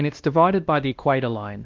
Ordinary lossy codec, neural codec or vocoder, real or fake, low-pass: Opus, 32 kbps; codec, 16 kHz, 1 kbps, X-Codec, WavLM features, trained on Multilingual LibriSpeech; fake; 7.2 kHz